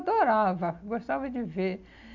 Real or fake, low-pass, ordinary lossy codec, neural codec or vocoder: real; 7.2 kHz; MP3, 48 kbps; none